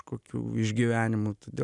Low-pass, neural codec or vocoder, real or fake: 10.8 kHz; none; real